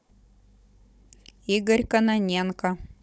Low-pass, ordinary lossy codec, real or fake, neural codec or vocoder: none; none; fake; codec, 16 kHz, 16 kbps, FunCodec, trained on Chinese and English, 50 frames a second